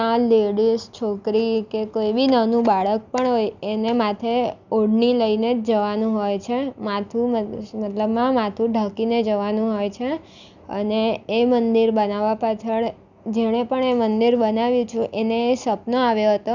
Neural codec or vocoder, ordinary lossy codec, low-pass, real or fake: none; none; 7.2 kHz; real